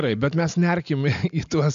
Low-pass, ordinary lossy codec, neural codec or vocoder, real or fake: 7.2 kHz; AAC, 96 kbps; none; real